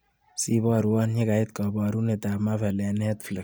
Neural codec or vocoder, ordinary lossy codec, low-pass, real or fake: none; none; none; real